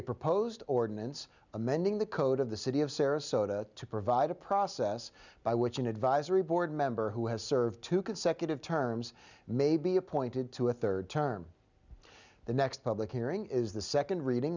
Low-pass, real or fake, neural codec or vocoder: 7.2 kHz; real; none